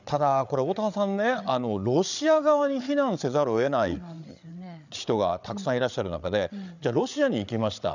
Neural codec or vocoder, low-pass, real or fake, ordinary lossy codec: codec, 16 kHz, 8 kbps, FreqCodec, larger model; 7.2 kHz; fake; none